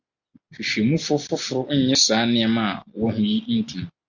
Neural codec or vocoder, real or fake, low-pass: none; real; 7.2 kHz